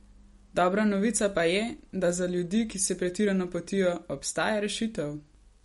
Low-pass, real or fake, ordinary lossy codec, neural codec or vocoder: 19.8 kHz; real; MP3, 48 kbps; none